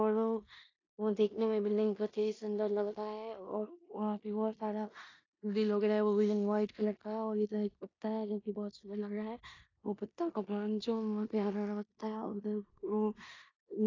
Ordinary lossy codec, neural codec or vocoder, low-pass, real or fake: AAC, 32 kbps; codec, 16 kHz in and 24 kHz out, 0.9 kbps, LongCat-Audio-Codec, four codebook decoder; 7.2 kHz; fake